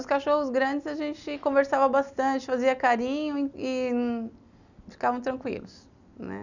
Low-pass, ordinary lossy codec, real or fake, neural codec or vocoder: 7.2 kHz; none; real; none